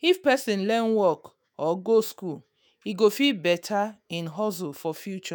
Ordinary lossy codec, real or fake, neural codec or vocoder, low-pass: none; fake; autoencoder, 48 kHz, 128 numbers a frame, DAC-VAE, trained on Japanese speech; none